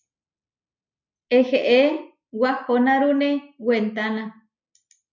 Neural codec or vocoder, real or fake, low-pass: none; real; 7.2 kHz